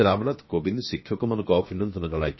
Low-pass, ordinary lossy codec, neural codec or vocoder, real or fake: 7.2 kHz; MP3, 24 kbps; codec, 16 kHz, 0.7 kbps, FocalCodec; fake